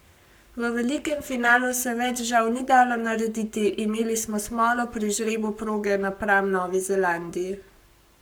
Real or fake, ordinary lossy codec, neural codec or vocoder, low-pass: fake; none; codec, 44.1 kHz, 7.8 kbps, Pupu-Codec; none